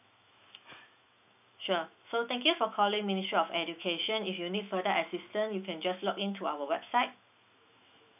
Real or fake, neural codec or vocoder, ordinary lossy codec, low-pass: fake; autoencoder, 48 kHz, 128 numbers a frame, DAC-VAE, trained on Japanese speech; none; 3.6 kHz